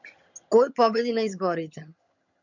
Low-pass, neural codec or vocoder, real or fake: 7.2 kHz; vocoder, 22.05 kHz, 80 mel bands, HiFi-GAN; fake